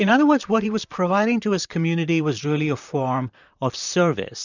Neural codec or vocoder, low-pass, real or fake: vocoder, 44.1 kHz, 128 mel bands, Pupu-Vocoder; 7.2 kHz; fake